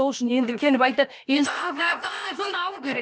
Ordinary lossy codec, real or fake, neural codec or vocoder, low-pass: none; fake; codec, 16 kHz, about 1 kbps, DyCAST, with the encoder's durations; none